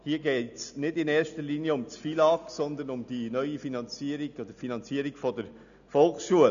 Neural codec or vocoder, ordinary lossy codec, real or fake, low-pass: none; none; real; 7.2 kHz